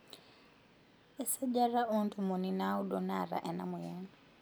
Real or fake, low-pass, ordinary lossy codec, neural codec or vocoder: real; none; none; none